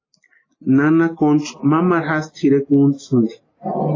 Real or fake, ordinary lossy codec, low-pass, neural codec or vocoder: real; AAC, 32 kbps; 7.2 kHz; none